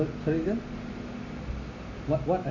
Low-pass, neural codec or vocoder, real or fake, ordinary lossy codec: 7.2 kHz; none; real; none